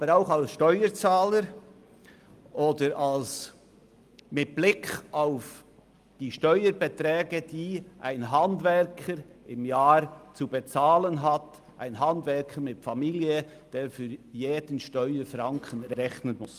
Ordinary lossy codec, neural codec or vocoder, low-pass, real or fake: Opus, 32 kbps; none; 14.4 kHz; real